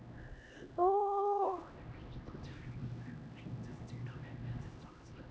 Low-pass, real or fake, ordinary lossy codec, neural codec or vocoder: none; fake; none; codec, 16 kHz, 1 kbps, X-Codec, HuBERT features, trained on LibriSpeech